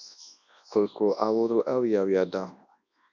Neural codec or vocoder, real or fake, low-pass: codec, 24 kHz, 0.9 kbps, WavTokenizer, large speech release; fake; 7.2 kHz